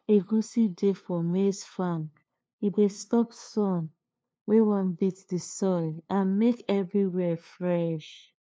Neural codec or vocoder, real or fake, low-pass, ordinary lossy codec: codec, 16 kHz, 2 kbps, FunCodec, trained on LibriTTS, 25 frames a second; fake; none; none